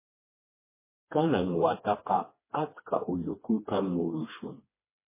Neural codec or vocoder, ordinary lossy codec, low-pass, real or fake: codec, 16 kHz, 2 kbps, FreqCodec, smaller model; MP3, 16 kbps; 3.6 kHz; fake